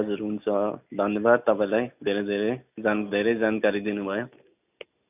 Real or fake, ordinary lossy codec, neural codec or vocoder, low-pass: real; none; none; 3.6 kHz